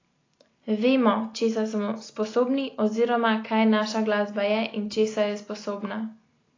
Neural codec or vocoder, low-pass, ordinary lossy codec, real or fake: none; 7.2 kHz; AAC, 32 kbps; real